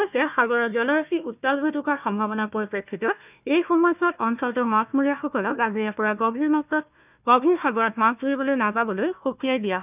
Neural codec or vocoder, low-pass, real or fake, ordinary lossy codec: codec, 16 kHz, 1 kbps, FunCodec, trained on Chinese and English, 50 frames a second; 3.6 kHz; fake; none